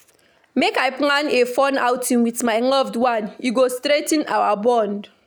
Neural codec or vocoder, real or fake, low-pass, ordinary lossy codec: none; real; none; none